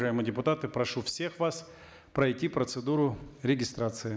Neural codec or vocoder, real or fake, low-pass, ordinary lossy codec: none; real; none; none